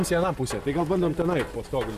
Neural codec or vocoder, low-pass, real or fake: none; 14.4 kHz; real